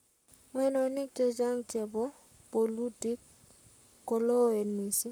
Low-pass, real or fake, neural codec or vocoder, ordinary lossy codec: none; fake; codec, 44.1 kHz, 7.8 kbps, Pupu-Codec; none